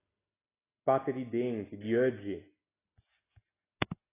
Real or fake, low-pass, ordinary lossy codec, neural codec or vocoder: real; 3.6 kHz; AAC, 16 kbps; none